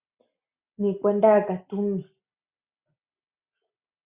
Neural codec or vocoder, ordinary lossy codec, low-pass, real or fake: none; Opus, 64 kbps; 3.6 kHz; real